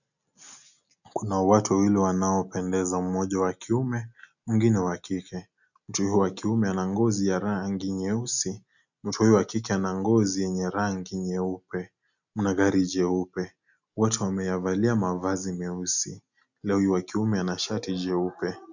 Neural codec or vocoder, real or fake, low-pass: none; real; 7.2 kHz